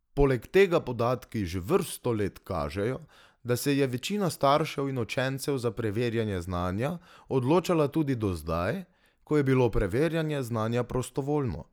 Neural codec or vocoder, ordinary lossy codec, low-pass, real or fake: none; none; 19.8 kHz; real